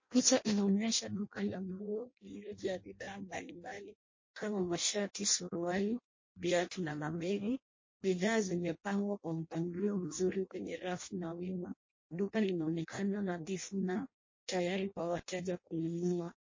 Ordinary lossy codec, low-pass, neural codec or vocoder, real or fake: MP3, 32 kbps; 7.2 kHz; codec, 16 kHz in and 24 kHz out, 0.6 kbps, FireRedTTS-2 codec; fake